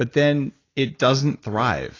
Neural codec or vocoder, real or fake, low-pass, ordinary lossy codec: none; real; 7.2 kHz; AAC, 32 kbps